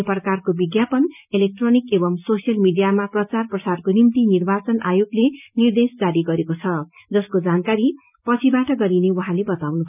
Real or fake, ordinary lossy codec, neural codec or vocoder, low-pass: real; none; none; 3.6 kHz